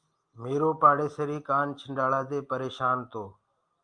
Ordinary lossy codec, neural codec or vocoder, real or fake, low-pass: Opus, 32 kbps; none; real; 9.9 kHz